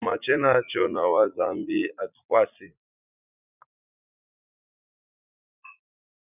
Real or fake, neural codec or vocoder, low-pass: fake; vocoder, 44.1 kHz, 80 mel bands, Vocos; 3.6 kHz